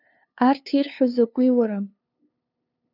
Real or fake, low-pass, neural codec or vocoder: fake; 5.4 kHz; codec, 16 kHz, 2 kbps, FunCodec, trained on LibriTTS, 25 frames a second